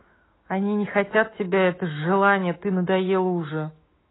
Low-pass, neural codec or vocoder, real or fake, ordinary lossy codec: 7.2 kHz; autoencoder, 48 kHz, 128 numbers a frame, DAC-VAE, trained on Japanese speech; fake; AAC, 16 kbps